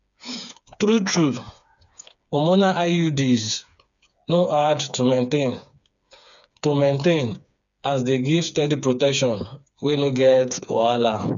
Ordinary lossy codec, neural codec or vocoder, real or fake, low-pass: none; codec, 16 kHz, 4 kbps, FreqCodec, smaller model; fake; 7.2 kHz